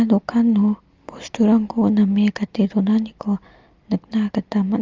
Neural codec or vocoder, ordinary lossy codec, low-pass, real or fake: none; Opus, 32 kbps; 7.2 kHz; real